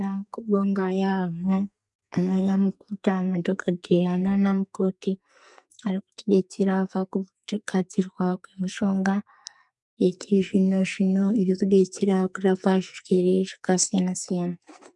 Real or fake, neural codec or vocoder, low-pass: fake; codec, 44.1 kHz, 2.6 kbps, SNAC; 10.8 kHz